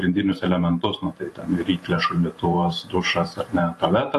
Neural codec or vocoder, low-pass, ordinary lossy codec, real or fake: vocoder, 44.1 kHz, 128 mel bands every 512 samples, BigVGAN v2; 14.4 kHz; AAC, 48 kbps; fake